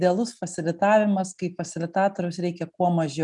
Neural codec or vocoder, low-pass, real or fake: none; 10.8 kHz; real